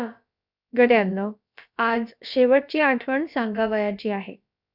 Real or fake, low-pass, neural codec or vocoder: fake; 5.4 kHz; codec, 16 kHz, about 1 kbps, DyCAST, with the encoder's durations